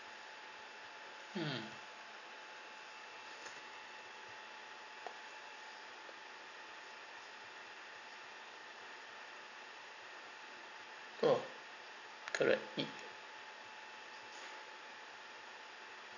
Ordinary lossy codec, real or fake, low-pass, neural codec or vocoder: none; real; 7.2 kHz; none